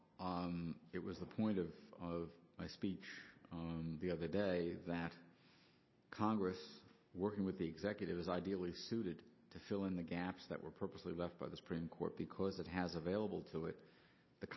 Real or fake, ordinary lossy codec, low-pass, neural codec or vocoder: real; MP3, 24 kbps; 7.2 kHz; none